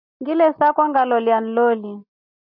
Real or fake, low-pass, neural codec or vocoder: real; 5.4 kHz; none